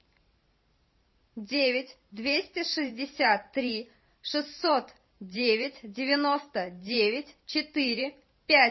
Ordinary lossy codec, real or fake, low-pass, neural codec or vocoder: MP3, 24 kbps; fake; 7.2 kHz; vocoder, 44.1 kHz, 128 mel bands every 512 samples, BigVGAN v2